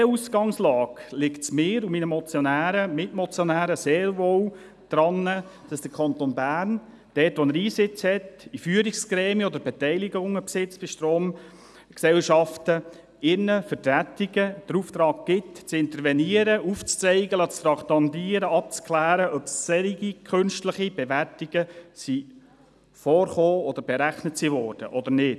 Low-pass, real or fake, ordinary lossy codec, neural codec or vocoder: none; real; none; none